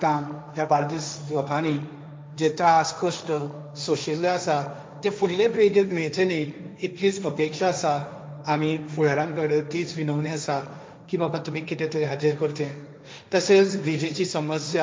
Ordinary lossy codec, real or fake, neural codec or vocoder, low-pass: none; fake; codec, 16 kHz, 1.1 kbps, Voila-Tokenizer; none